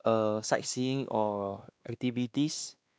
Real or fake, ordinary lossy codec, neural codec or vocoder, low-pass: fake; none; codec, 16 kHz, 2 kbps, X-Codec, WavLM features, trained on Multilingual LibriSpeech; none